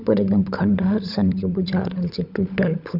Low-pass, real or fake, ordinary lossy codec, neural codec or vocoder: 5.4 kHz; fake; none; codec, 16 kHz, 16 kbps, FunCodec, trained on LibriTTS, 50 frames a second